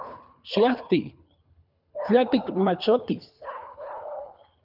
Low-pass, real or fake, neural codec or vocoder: 5.4 kHz; fake; codec, 24 kHz, 3 kbps, HILCodec